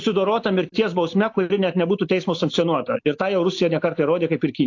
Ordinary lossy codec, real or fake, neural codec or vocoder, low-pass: AAC, 48 kbps; real; none; 7.2 kHz